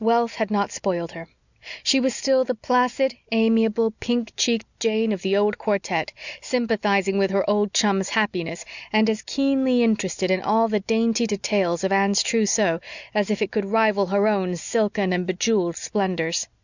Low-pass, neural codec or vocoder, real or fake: 7.2 kHz; none; real